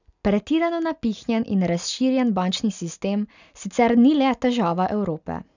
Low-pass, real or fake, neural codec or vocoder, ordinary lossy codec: 7.2 kHz; real; none; none